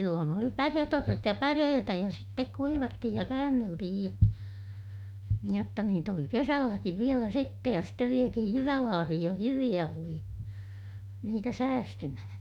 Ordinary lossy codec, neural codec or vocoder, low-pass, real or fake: none; autoencoder, 48 kHz, 32 numbers a frame, DAC-VAE, trained on Japanese speech; 19.8 kHz; fake